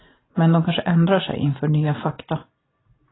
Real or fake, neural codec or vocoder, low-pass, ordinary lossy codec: real; none; 7.2 kHz; AAC, 16 kbps